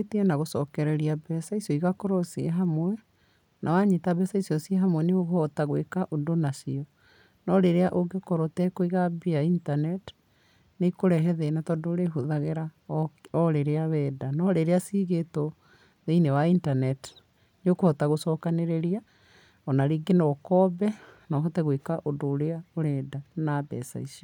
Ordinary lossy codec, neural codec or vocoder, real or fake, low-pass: none; none; real; 19.8 kHz